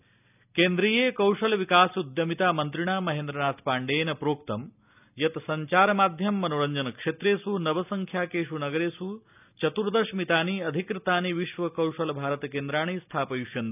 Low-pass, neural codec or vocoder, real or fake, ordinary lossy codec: 3.6 kHz; none; real; none